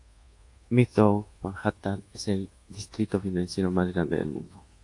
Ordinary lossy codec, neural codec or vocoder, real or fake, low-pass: AAC, 48 kbps; codec, 24 kHz, 1.2 kbps, DualCodec; fake; 10.8 kHz